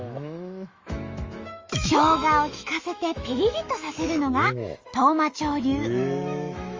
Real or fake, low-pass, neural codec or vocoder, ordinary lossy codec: fake; 7.2 kHz; autoencoder, 48 kHz, 128 numbers a frame, DAC-VAE, trained on Japanese speech; Opus, 32 kbps